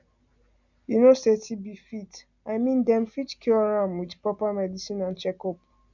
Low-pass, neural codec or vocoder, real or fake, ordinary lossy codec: 7.2 kHz; none; real; none